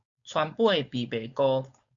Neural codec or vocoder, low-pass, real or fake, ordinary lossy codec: codec, 16 kHz, 4.8 kbps, FACodec; 7.2 kHz; fake; MP3, 96 kbps